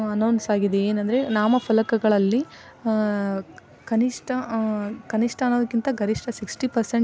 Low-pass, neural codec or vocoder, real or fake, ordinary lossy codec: none; none; real; none